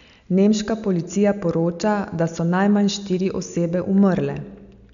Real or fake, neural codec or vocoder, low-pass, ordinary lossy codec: real; none; 7.2 kHz; none